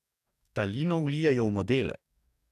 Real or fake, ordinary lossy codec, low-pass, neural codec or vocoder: fake; none; 14.4 kHz; codec, 44.1 kHz, 2.6 kbps, DAC